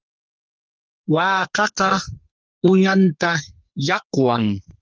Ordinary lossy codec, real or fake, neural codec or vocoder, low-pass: Opus, 24 kbps; fake; codec, 44.1 kHz, 2.6 kbps, SNAC; 7.2 kHz